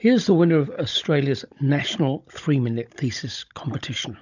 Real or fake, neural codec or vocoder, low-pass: fake; codec, 16 kHz, 16 kbps, FunCodec, trained on Chinese and English, 50 frames a second; 7.2 kHz